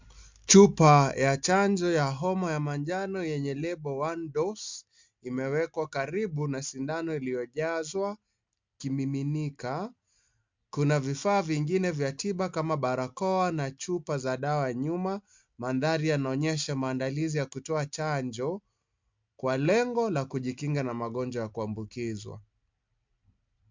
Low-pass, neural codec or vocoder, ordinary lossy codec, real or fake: 7.2 kHz; none; MP3, 64 kbps; real